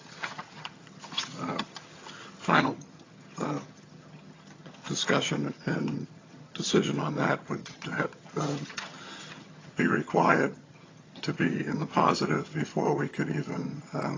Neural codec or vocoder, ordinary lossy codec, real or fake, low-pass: vocoder, 22.05 kHz, 80 mel bands, HiFi-GAN; AAC, 32 kbps; fake; 7.2 kHz